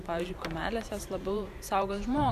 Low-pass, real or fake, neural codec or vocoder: 14.4 kHz; fake; vocoder, 44.1 kHz, 128 mel bands every 512 samples, BigVGAN v2